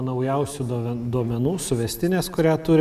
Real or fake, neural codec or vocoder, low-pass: real; none; 14.4 kHz